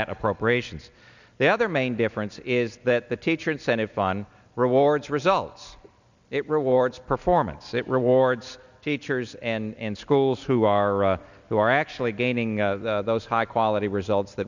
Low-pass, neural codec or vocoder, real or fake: 7.2 kHz; none; real